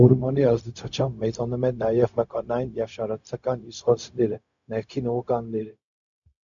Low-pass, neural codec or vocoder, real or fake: 7.2 kHz; codec, 16 kHz, 0.4 kbps, LongCat-Audio-Codec; fake